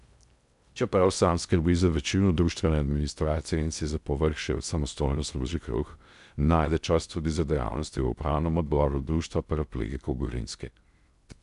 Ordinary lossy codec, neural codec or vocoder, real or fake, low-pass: none; codec, 16 kHz in and 24 kHz out, 0.6 kbps, FocalCodec, streaming, 2048 codes; fake; 10.8 kHz